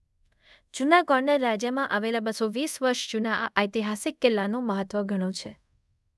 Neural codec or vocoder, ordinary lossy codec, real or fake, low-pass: codec, 24 kHz, 0.5 kbps, DualCodec; none; fake; none